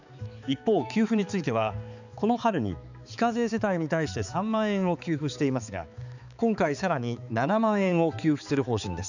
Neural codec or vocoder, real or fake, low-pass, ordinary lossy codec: codec, 16 kHz, 4 kbps, X-Codec, HuBERT features, trained on balanced general audio; fake; 7.2 kHz; none